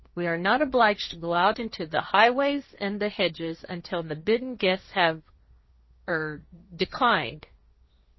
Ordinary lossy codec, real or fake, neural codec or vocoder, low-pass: MP3, 24 kbps; fake; codec, 16 kHz, 1.1 kbps, Voila-Tokenizer; 7.2 kHz